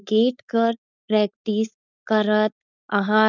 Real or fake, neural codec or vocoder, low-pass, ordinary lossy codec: fake; codec, 16 kHz, 4.8 kbps, FACodec; 7.2 kHz; none